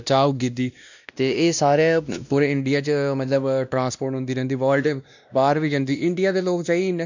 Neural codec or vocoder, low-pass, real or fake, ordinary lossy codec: codec, 16 kHz, 1 kbps, X-Codec, WavLM features, trained on Multilingual LibriSpeech; 7.2 kHz; fake; none